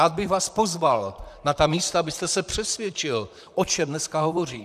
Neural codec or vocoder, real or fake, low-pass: vocoder, 44.1 kHz, 128 mel bands, Pupu-Vocoder; fake; 14.4 kHz